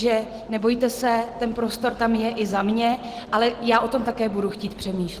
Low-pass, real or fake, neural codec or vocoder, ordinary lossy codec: 14.4 kHz; real; none; Opus, 16 kbps